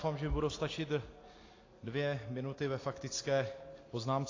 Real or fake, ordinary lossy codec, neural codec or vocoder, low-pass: real; AAC, 32 kbps; none; 7.2 kHz